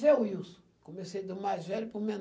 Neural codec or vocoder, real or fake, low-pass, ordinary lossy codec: none; real; none; none